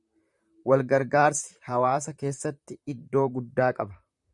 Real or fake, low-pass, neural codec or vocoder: fake; 10.8 kHz; vocoder, 44.1 kHz, 128 mel bands, Pupu-Vocoder